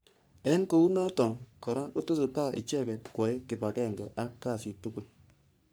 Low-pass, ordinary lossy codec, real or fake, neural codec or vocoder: none; none; fake; codec, 44.1 kHz, 3.4 kbps, Pupu-Codec